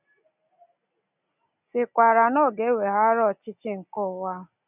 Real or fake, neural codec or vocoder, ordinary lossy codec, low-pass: real; none; none; 3.6 kHz